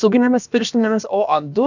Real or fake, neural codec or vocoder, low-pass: fake; codec, 16 kHz, about 1 kbps, DyCAST, with the encoder's durations; 7.2 kHz